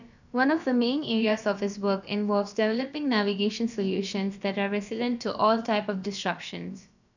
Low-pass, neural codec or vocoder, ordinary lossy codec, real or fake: 7.2 kHz; codec, 16 kHz, about 1 kbps, DyCAST, with the encoder's durations; none; fake